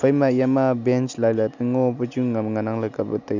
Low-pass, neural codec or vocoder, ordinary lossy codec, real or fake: 7.2 kHz; none; none; real